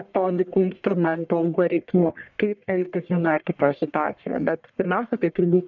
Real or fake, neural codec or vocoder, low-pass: fake; codec, 44.1 kHz, 1.7 kbps, Pupu-Codec; 7.2 kHz